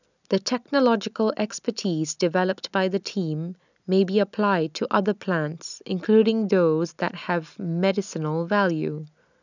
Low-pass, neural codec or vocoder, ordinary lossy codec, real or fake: 7.2 kHz; none; none; real